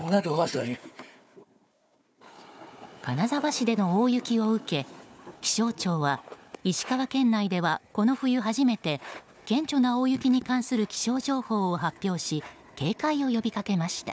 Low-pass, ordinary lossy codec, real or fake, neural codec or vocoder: none; none; fake; codec, 16 kHz, 4 kbps, FunCodec, trained on Chinese and English, 50 frames a second